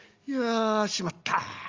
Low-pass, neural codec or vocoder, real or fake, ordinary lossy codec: 7.2 kHz; none; real; Opus, 32 kbps